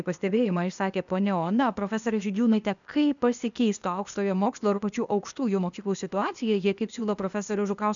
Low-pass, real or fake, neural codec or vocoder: 7.2 kHz; fake; codec, 16 kHz, 0.8 kbps, ZipCodec